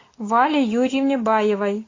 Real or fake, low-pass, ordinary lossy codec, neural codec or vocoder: real; 7.2 kHz; AAC, 32 kbps; none